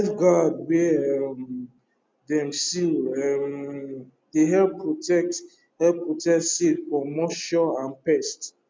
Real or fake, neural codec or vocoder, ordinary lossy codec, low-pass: real; none; none; none